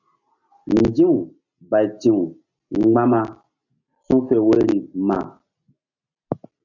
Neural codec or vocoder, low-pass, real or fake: none; 7.2 kHz; real